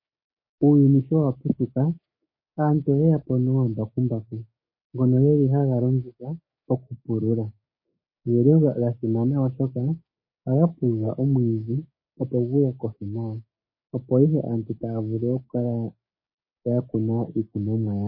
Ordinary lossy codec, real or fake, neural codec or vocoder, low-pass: MP3, 24 kbps; fake; codec, 16 kHz, 6 kbps, DAC; 5.4 kHz